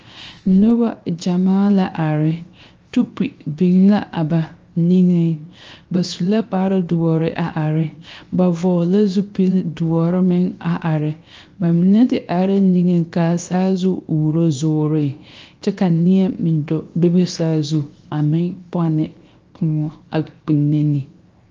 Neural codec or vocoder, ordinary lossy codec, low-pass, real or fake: codec, 16 kHz, 0.7 kbps, FocalCodec; Opus, 24 kbps; 7.2 kHz; fake